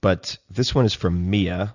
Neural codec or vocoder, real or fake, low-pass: none; real; 7.2 kHz